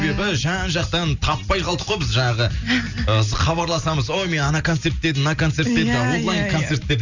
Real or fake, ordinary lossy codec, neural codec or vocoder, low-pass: real; none; none; 7.2 kHz